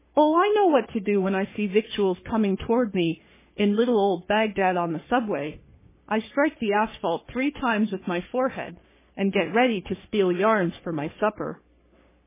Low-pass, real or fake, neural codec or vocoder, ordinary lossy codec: 3.6 kHz; fake; codec, 44.1 kHz, 3.4 kbps, Pupu-Codec; MP3, 16 kbps